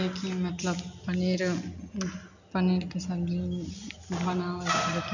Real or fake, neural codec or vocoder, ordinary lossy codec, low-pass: real; none; none; 7.2 kHz